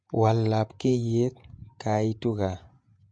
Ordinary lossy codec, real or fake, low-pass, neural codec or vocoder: MP3, 64 kbps; fake; 9.9 kHz; vocoder, 48 kHz, 128 mel bands, Vocos